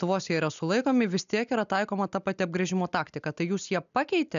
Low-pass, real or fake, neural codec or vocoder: 7.2 kHz; real; none